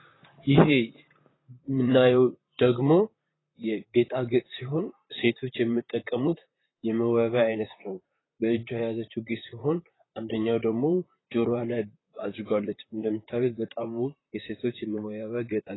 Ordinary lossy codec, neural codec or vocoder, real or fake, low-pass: AAC, 16 kbps; vocoder, 44.1 kHz, 128 mel bands, Pupu-Vocoder; fake; 7.2 kHz